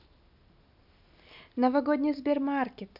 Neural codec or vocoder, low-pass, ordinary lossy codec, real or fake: none; 5.4 kHz; none; real